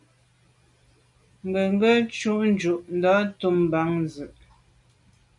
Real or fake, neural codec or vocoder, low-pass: real; none; 10.8 kHz